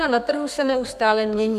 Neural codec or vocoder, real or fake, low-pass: codec, 32 kHz, 1.9 kbps, SNAC; fake; 14.4 kHz